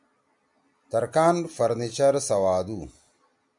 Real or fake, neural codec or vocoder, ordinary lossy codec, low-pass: real; none; MP3, 96 kbps; 10.8 kHz